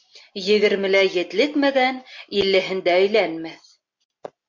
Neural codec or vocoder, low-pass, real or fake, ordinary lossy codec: none; 7.2 kHz; real; MP3, 48 kbps